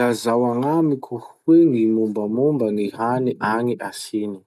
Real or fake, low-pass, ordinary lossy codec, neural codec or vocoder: real; none; none; none